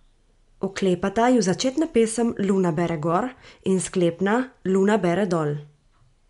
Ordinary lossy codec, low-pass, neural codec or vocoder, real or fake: MP3, 64 kbps; 10.8 kHz; none; real